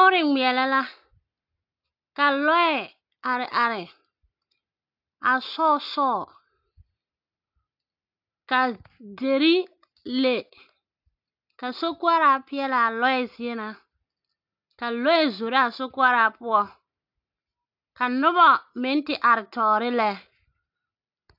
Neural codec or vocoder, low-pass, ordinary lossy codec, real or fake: none; 5.4 kHz; AAC, 48 kbps; real